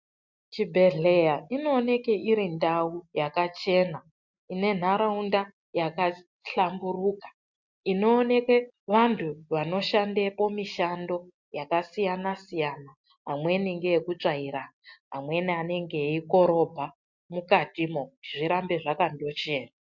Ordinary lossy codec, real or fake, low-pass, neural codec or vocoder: MP3, 64 kbps; real; 7.2 kHz; none